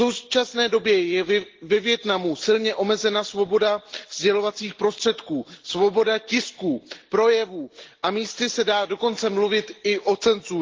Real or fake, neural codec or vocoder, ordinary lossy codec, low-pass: real; none; Opus, 16 kbps; 7.2 kHz